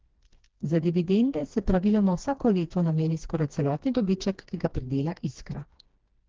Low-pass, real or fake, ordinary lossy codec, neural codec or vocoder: 7.2 kHz; fake; Opus, 16 kbps; codec, 16 kHz, 2 kbps, FreqCodec, smaller model